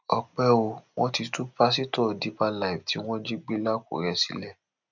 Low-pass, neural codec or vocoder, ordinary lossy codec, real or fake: 7.2 kHz; none; none; real